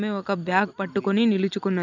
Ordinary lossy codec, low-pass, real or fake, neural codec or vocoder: none; 7.2 kHz; real; none